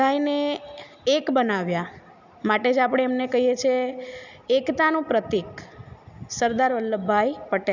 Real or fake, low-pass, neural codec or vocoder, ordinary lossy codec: real; 7.2 kHz; none; none